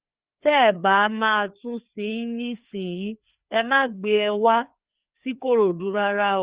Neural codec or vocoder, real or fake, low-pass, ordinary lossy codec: codec, 16 kHz, 2 kbps, FreqCodec, larger model; fake; 3.6 kHz; Opus, 32 kbps